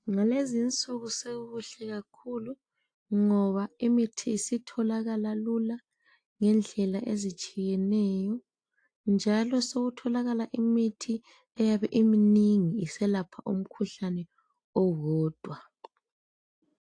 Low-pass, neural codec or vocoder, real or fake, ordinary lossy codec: 9.9 kHz; none; real; AAC, 48 kbps